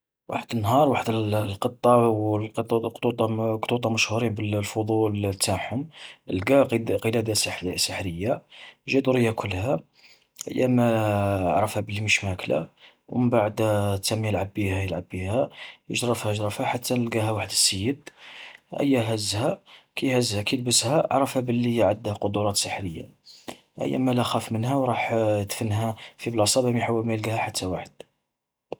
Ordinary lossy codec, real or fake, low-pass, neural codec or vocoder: none; real; none; none